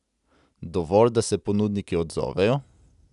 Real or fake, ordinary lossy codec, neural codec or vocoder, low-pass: real; none; none; 10.8 kHz